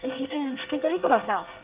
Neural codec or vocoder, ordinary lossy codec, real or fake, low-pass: codec, 24 kHz, 1 kbps, SNAC; Opus, 24 kbps; fake; 3.6 kHz